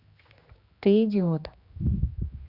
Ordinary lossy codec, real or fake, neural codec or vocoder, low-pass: Opus, 64 kbps; fake; codec, 16 kHz, 2 kbps, X-Codec, HuBERT features, trained on general audio; 5.4 kHz